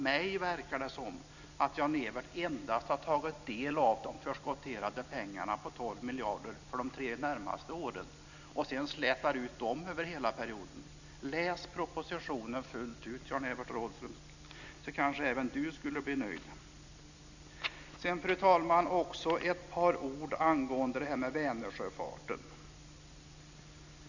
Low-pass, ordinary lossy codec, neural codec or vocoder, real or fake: 7.2 kHz; none; none; real